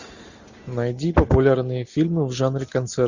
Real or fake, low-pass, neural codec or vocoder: real; 7.2 kHz; none